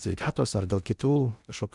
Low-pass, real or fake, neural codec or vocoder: 10.8 kHz; fake; codec, 16 kHz in and 24 kHz out, 0.6 kbps, FocalCodec, streaming, 2048 codes